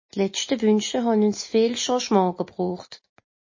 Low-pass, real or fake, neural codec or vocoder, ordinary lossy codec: 7.2 kHz; real; none; MP3, 32 kbps